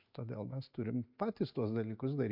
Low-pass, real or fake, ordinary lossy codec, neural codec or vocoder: 5.4 kHz; real; MP3, 48 kbps; none